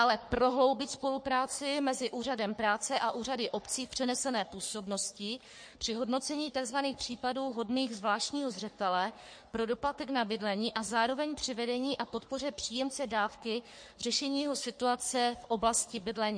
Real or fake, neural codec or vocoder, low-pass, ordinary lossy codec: fake; codec, 44.1 kHz, 3.4 kbps, Pupu-Codec; 9.9 kHz; MP3, 48 kbps